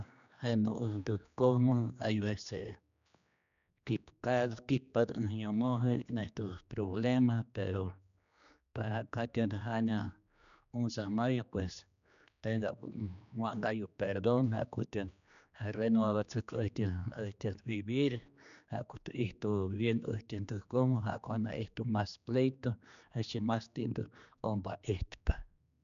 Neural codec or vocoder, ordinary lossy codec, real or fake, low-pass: codec, 16 kHz, 2 kbps, X-Codec, HuBERT features, trained on general audio; none; fake; 7.2 kHz